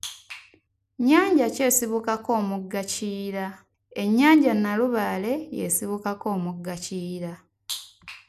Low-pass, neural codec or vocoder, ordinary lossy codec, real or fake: 14.4 kHz; none; none; real